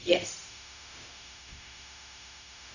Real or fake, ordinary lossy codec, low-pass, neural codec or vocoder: fake; none; 7.2 kHz; codec, 16 kHz, 0.4 kbps, LongCat-Audio-Codec